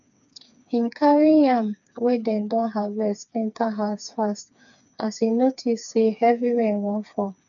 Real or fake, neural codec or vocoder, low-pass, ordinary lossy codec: fake; codec, 16 kHz, 4 kbps, FreqCodec, smaller model; 7.2 kHz; none